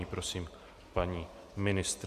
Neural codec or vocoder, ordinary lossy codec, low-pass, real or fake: none; Opus, 64 kbps; 14.4 kHz; real